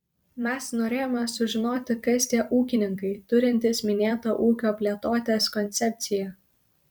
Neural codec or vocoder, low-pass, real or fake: vocoder, 44.1 kHz, 128 mel bands every 256 samples, BigVGAN v2; 19.8 kHz; fake